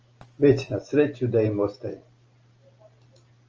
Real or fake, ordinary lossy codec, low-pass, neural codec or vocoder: real; Opus, 24 kbps; 7.2 kHz; none